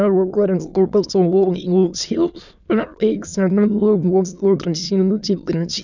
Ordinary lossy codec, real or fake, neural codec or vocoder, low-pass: none; fake; autoencoder, 22.05 kHz, a latent of 192 numbers a frame, VITS, trained on many speakers; 7.2 kHz